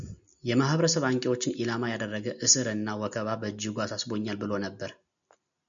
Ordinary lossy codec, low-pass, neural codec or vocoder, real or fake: MP3, 96 kbps; 7.2 kHz; none; real